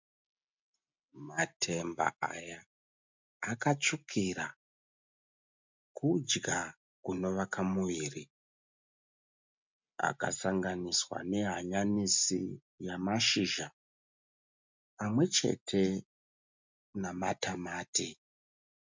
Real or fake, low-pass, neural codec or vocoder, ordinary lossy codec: real; 7.2 kHz; none; MP3, 64 kbps